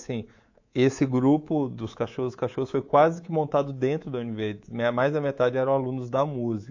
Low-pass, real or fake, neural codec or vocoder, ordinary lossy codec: 7.2 kHz; fake; codec, 24 kHz, 3.1 kbps, DualCodec; AAC, 48 kbps